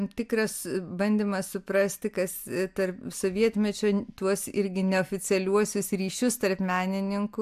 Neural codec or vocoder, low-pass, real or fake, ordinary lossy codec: none; 14.4 kHz; real; AAC, 96 kbps